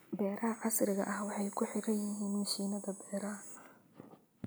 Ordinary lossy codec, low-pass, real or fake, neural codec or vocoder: none; none; real; none